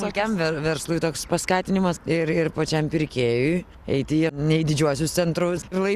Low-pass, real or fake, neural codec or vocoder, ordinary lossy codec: 14.4 kHz; real; none; Opus, 64 kbps